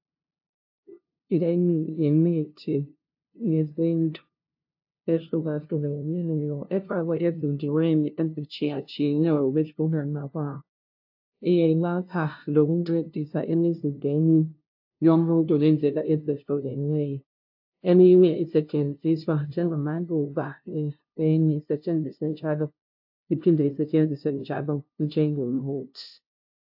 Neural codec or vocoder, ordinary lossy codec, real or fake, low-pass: codec, 16 kHz, 0.5 kbps, FunCodec, trained on LibriTTS, 25 frames a second; AAC, 48 kbps; fake; 5.4 kHz